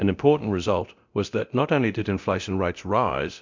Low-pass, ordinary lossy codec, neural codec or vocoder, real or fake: 7.2 kHz; MP3, 64 kbps; codec, 24 kHz, 0.9 kbps, DualCodec; fake